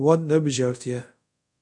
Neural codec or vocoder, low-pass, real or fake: codec, 24 kHz, 0.5 kbps, DualCodec; 10.8 kHz; fake